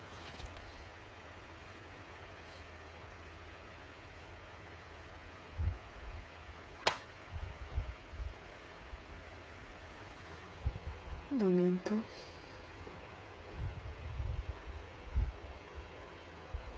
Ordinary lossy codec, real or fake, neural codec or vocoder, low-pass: none; fake; codec, 16 kHz, 8 kbps, FreqCodec, smaller model; none